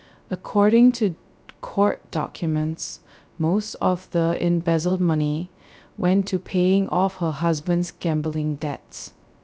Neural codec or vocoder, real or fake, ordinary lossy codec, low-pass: codec, 16 kHz, 0.3 kbps, FocalCodec; fake; none; none